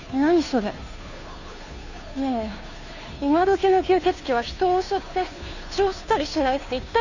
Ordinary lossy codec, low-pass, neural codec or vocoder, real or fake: none; 7.2 kHz; codec, 16 kHz, 2 kbps, FunCodec, trained on Chinese and English, 25 frames a second; fake